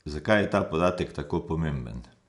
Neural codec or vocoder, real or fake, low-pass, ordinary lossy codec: none; real; 10.8 kHz; none